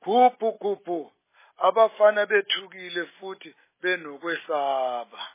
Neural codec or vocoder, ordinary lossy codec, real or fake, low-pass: none; MP3, 16 kbps; real; 3.6 kHz